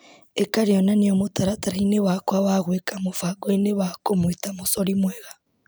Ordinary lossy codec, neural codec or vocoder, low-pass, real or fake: none; none; none; real